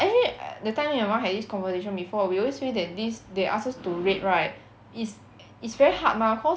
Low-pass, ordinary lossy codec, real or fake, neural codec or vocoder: none; none; real; none